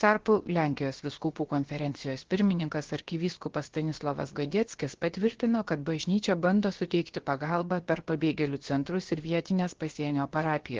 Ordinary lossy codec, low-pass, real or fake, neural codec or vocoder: Opus, 16 kbps; 7.2 kHz; fake; codec, 16 kHz, about 1 kbps, DyCAST, with the encoder's durations